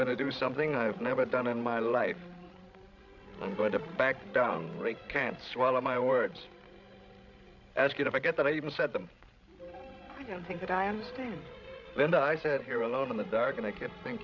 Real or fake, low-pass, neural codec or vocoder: fake; 7.2 kHz; codec, 16 kHz, 16 kbps, FreqCodec, larger model